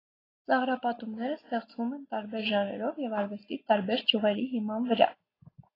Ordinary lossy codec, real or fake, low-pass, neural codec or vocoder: AAC, 24 kbps; real; 5.4 kHz; none